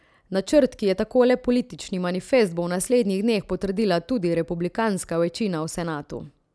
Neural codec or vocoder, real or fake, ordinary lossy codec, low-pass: none; real; none; none